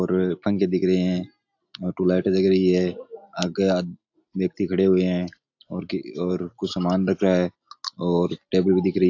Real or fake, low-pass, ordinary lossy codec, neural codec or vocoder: real; 7.2 kHz; AAC, 48 kbps; none